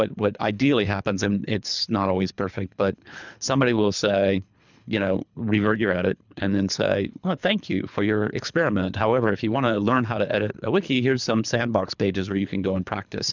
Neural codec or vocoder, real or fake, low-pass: codec, 24 kHz, 3 kbps, HILCodec; fake; 7.2 kHz